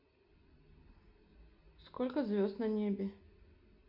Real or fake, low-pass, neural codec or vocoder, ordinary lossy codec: real; 5.4 kHz; none; none